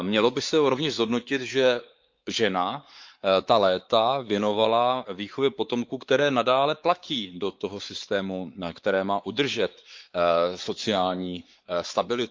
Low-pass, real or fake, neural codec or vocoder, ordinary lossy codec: 7.2 kHz; fake; codec, 16 kHz, 4 kbps, X-Codec, WavLM features, trained on Multilingual LibriSpeech; Opus, 32 kbps